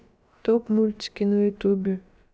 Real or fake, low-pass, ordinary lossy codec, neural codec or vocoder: fake; none; none; codec, 16 kHz, about 1 kbps, DyCAST, with the encoder's durations